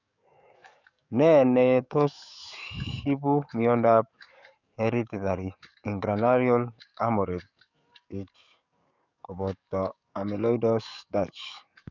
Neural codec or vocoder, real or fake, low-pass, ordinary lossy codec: codec, 44.1 kHz, 7.8 kbps, DAC; fake; 7.2 kHz; none